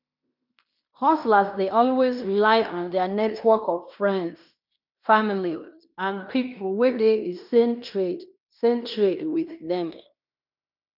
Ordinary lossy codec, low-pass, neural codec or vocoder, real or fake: none; 5.4 kHz; codec, 16 kHz in and 24 kHz out, 0.9 kbps, LongCat-Audio-Codec, fine tuned four codebook decoder; fake